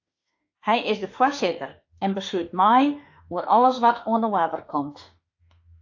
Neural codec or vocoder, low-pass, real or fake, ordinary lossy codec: autoencoder, 48 kHz, 32 numbers a frame, DAC-VAE, trained on Japanese speech; 7.2 kHz; fake; AAC, 48 kbps